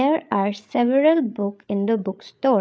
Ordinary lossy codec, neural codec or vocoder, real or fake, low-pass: none; codec, 16 kHz, 8 kbps, FreqCodec, larger model; fake; none